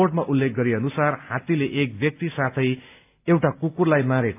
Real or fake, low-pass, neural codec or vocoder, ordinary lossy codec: real; 3.6 kHz; none; AAC, 32 kbps